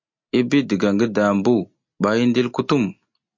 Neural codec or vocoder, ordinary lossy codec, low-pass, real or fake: none; MP3, 48 kbps; 7.2 kHz; real